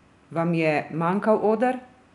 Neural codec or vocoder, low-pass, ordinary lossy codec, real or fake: none; 10.8 kHz; none; real